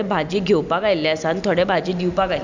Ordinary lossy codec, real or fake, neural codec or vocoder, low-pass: none; real; none; 7.2 kHz